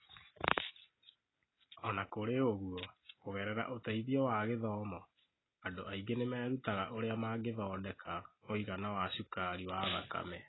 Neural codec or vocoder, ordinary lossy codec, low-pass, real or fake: none; AAC, 16 kbps; 7.2 kHz; real